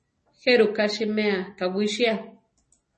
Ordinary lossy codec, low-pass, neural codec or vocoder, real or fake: MP3, 32 kbps; 10.8 kHz; none; real